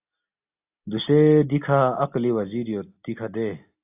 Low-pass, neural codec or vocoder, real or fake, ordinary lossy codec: 3.6 kHz; none; real; AAC, 32 kbps